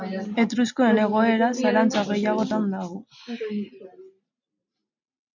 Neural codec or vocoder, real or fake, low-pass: none; real; 7.2 kHz